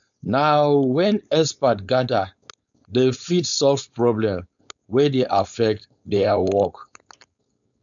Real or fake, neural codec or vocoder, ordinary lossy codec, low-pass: fake; codec, 16 kHz, 4.8 kbps, FACodec; none; 7.2 kHz